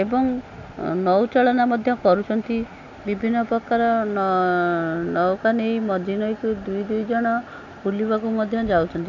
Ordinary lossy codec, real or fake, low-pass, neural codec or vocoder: none; real; 7.2 kHz; none